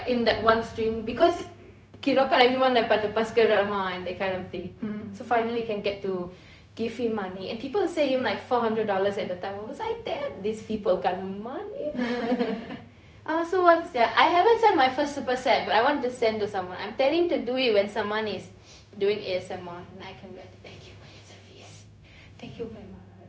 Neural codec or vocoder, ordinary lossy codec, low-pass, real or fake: codec, 16 kHz, 0.4 kbps, LongCat-Audio-Codec; none; none; fake